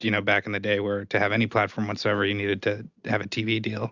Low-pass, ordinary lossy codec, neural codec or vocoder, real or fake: 7.2 kHz; Opus, 64 kbps; none; real